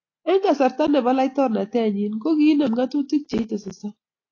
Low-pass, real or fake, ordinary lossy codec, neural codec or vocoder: 7.2 kHz; real; AAC, 32 kbps; none